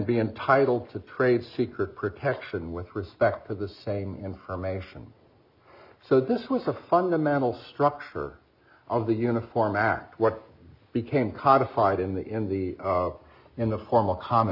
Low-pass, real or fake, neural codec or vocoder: 5.4 kHz; real; none